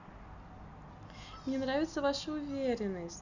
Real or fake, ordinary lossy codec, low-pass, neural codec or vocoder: real; none; 7.2 kHz; none